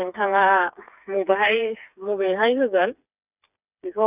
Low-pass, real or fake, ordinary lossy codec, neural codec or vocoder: 3.6 kHz; fake; none; codec, 16 kHz, 4 kbps, FreqCodec, smaller model